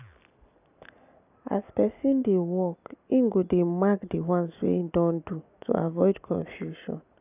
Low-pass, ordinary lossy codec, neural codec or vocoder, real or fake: 3.6 kHz; none; none; real